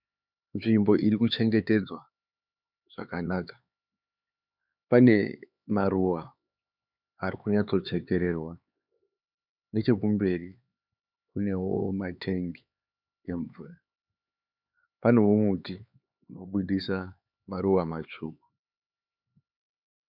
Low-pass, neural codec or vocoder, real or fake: 5.4 kHz; codec, 16 kHz, 4 kbps, X-Codec, HuBERT features, trained on LibriSpeech; fake